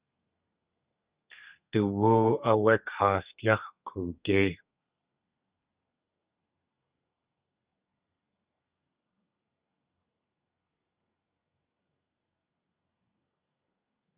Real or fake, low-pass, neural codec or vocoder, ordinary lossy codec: fake; 3.6 kHz; codec, 32 kHz, 1.9 kbps, SNAC; Opus, 64 kbps